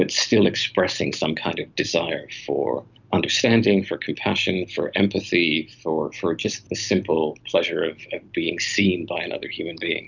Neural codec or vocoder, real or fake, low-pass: none; real; 7.2 kHz